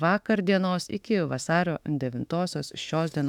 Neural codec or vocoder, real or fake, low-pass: autoencoder, 48 kHz, 32 numbers a frame, DAC-VAE, trained on Japanese speech; fake; 19.8 kHz